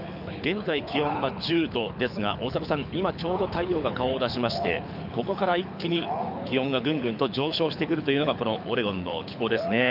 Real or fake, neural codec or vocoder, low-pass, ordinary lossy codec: fake; codec, 24 kHz, 6 kbps, HILCodec; 5.4 kHz; none